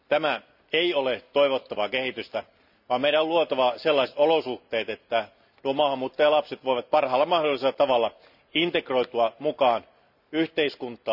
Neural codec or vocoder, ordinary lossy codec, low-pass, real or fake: none; none; 5.4 kHz; real